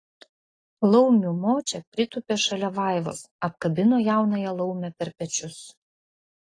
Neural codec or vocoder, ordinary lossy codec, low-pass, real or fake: none; AAC, 32 kbps; 9.9 kHz; real